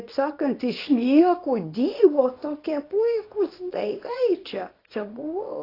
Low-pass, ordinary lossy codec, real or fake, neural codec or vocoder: 5.4 kHz; AAC, 24 kbps; fake; codec, 24 kHz, 0.9 kbps, WavTokenizer, small release